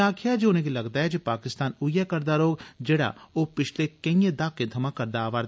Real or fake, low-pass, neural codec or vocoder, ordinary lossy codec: real; none; none; none